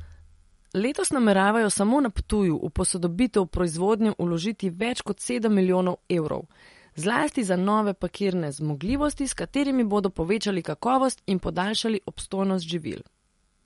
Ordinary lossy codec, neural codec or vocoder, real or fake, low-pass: MP3, 48 kbps; none; real; 19.8 kHz